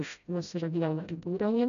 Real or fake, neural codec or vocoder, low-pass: fake; codec, 16 kHz, 0.5 kbps, FreqCodec, smaller model; 7.2 kHz